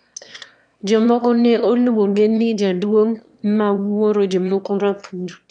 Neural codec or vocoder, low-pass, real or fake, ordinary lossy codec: autoencoder, 22.05 kHz, a latent of 192 numbers a frame, VITS, trained on one speaker; 9.9 kHz; fake; none